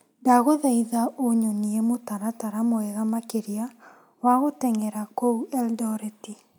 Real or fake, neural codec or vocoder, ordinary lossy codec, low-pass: real; none; none; none